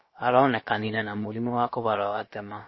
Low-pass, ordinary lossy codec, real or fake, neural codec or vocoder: 7.2 kHz; MP3, 24 kbps; fake; codec, 16 kHz, about 1 kbps, DyCAST, with the encoder's durations